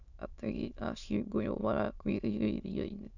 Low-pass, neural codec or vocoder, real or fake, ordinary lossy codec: 7.2 kHz; autoencoder, 22.05 kHz, a latent of 192 numbers a frame, VITS, trained on many speakers; fake; none